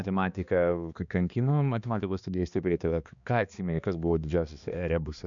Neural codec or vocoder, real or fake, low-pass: codec, 16 kHz, 2 kbps, X-Codec, HuBERT features, trained on balanced general audio; fake; 7.2 kHz